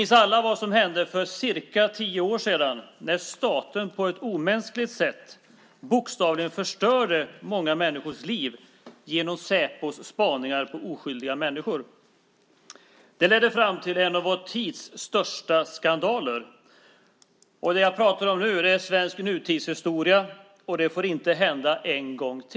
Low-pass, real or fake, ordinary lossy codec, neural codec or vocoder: none; real; none; none